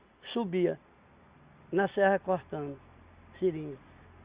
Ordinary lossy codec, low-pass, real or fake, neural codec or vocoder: Opus, 64 kbps; 3.6 kHz; real; none